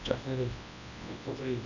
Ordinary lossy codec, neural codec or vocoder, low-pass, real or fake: none; codec, 24 kHz, 0.9 kbps, WavTokenizer, large speech release; 7.2 kHz; fake